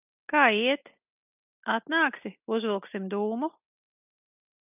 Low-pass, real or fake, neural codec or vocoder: 3.6 kHz; real; none